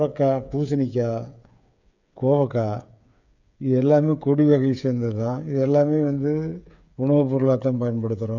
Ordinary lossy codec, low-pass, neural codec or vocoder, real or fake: none; 7.2 kHz; codec, 16 kHz, 8 kbps, FreqCodec, smaller model; fake